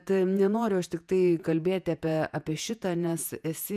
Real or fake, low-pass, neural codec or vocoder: fake; 14.4 kHz; vocoder, 48 kHz, 128 mel bands, Vocos